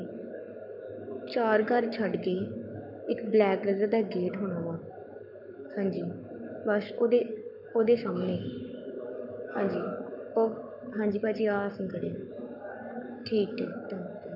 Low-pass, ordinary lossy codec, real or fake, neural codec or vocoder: 5.4 kHz; none; fake; codec, 44.1 kHz, 7.8 kbps, Pupu-Codec